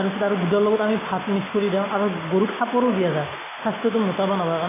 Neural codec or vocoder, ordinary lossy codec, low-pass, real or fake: none; AAC, 16 kbps; 3.6 kHz; real